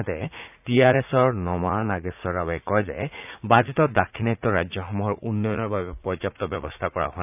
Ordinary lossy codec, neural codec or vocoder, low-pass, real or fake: none; vocoder, 44.1 kHz, 80 mel bands, Vocos; 3.6 kHz; fake